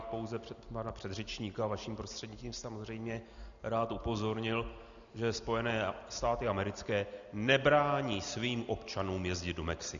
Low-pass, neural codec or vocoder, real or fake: 7.2 kHz; none; real